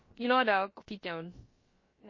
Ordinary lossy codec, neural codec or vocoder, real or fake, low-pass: MP3, 32 kbps; codec, 16 kHz, about 1 kbps, DyCAST, with the encoder's durations; fake; 7.2 kHz